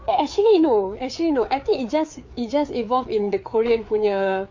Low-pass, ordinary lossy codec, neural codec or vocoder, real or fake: 7.2 kHz; MP3, 48 kbps; codec, 16 kHz, 4 kbps, FreqCodec, larger model; fake